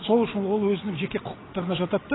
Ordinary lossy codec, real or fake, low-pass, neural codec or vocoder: AAC, 16 kbps; real; 7.2 kHz; none